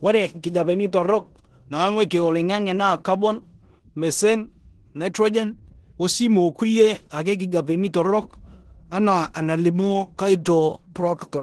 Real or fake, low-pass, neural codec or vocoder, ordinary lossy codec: fake; 10.8 kHz; codec, 16 kHz in and 24 kHz out, 0.9 kbps, LongCat-Audio-Codec, four codebook decoder; Opus, 16 kbps